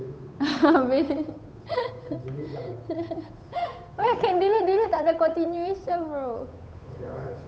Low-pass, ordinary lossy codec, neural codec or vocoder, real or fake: none; none; codec, 16 kHz, 8 kbps, FunCodec, trained on Chinese and English, 25 frames a second; fake